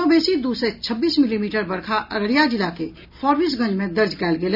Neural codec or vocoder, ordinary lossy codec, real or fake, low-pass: none; none; real; 5.4 kHz